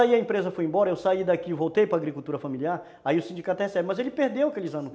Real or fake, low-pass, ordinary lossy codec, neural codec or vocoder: real; none; none; none